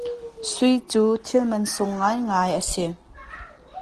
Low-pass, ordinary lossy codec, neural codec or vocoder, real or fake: 10.8 kHz; Opus, 16 kbps; none; real